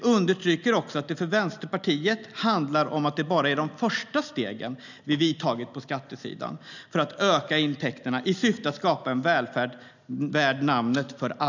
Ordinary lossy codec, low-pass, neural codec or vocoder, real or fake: none; 7.2 kHz; none; real